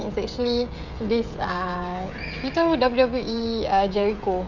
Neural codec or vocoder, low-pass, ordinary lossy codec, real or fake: codec, 16 kHz, 16 kbps, FreqCodec, smaller model; 7.2 kHz; none; fake